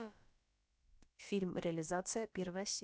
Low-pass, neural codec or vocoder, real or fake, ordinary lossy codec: none; codec, 16 kHz, about 1 kbps, DyCAST, with the encoder's durations; fake; none